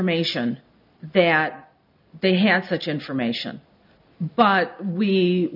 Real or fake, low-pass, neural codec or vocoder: real; 5.4 kHz; none